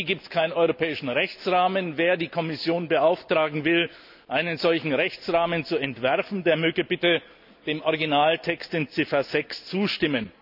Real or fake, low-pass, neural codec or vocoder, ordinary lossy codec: real; 5.4 kHz; none; none